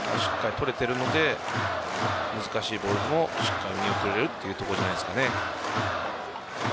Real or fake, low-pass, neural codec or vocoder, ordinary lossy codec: real; none; none; none